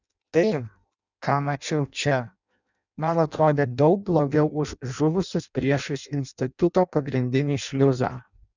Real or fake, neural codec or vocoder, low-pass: fake; codec, 16 kHz in and 24 kHz out, 0.6 kbps, FireRedTTS-2 codec; 7.2 kHz